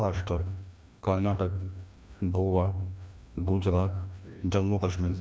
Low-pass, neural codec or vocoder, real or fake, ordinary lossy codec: none; codec, 16 kHz, 1 kbps, FreqCodec, larger model; fake; none